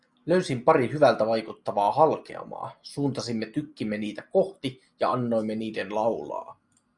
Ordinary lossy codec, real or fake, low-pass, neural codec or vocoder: Opus, 64 kbps; real; 10.8 kHz; none